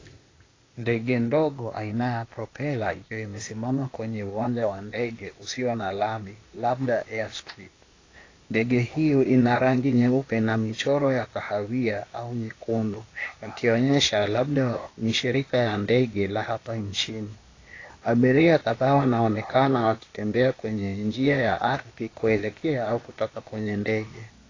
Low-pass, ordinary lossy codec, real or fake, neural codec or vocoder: 7.2 kHz; AAC, 32 kbps; fake; codec, 16 kHz, 0.8 kbps, ZipCodec